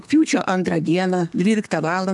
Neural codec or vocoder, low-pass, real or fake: codec, 32 kHz, 1.9 kbps, SNAC; 10.8 kHz; fake